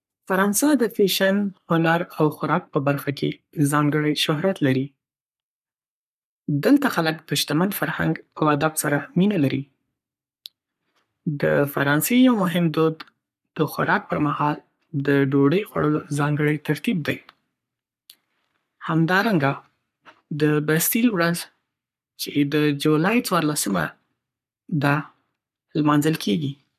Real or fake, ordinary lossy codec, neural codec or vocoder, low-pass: fake; none; codec, 44.1 kHz, 3.4 kbps, Pupu-Codec; 14.4 kHz